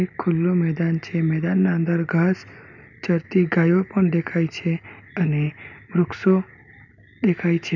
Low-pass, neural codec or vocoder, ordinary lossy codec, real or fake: 7.2 kHz; none; none; real